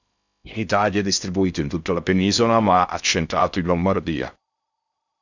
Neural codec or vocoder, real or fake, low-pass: codec, 16 kHz in and 24 kHz out, 0.6 kbps, FocalCodec, streaming, 2048 codes; fake; 7.2 kHz